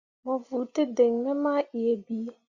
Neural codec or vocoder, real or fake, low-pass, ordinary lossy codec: none; real; 7.2 kHz; Opus, 64 kbps